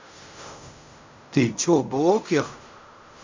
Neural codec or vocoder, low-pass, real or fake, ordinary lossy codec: codec, 16 kHz in and 24 kHz out, 0.4 kbps, LongCat-Audio-Codec, fine tuned four codebook decoder; 7.2 kHz; fake; MP3, 64 kbps